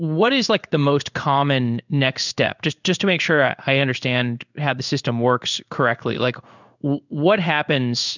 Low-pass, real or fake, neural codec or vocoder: 7.2 kHz; fake; codec, 16 kHz in and 24 kHz out, 1 kbps, XY-Tokenizer